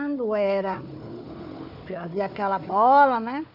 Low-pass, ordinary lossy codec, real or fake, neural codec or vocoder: 5.4 kHz; none; fake; codec, 16 kHz, 4 kbps, FunCodec, trained on Chinese and English, 50 frames a second